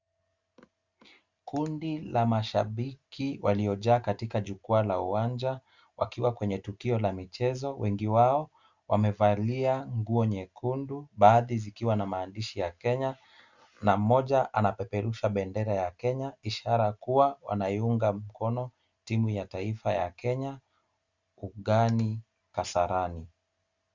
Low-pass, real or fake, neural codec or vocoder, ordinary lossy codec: 7.2 kHz; real; none; Opus, 64 kbps